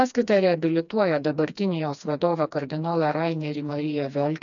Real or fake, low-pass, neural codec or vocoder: fake; 7.2 kHz; codec, 16 kHz, 2 kbps, FreqCodec, smaller model